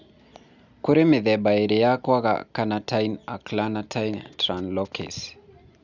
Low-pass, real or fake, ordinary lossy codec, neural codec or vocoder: 7.2 kHz; real; none; none